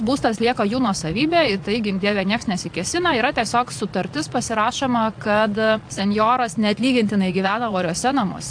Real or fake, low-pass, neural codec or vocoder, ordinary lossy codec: fake; 9.9 kHz; vocoder, 22.05 kHz, 80 mel bands, Vocos; AAC, 64 kbps